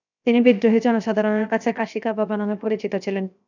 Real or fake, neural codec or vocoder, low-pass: fake; codec, 16 kHz, 0.7 kbps, FocalCodec; 7.2 kHz